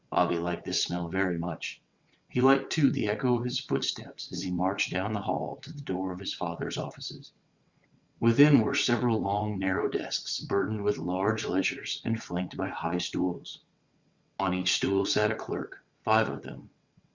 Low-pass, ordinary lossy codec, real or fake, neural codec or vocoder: 7.2 kHz; Opus, 64 kbps; fake; vocoder, 22.05 kHz, 80 mel bands, WaveNeXt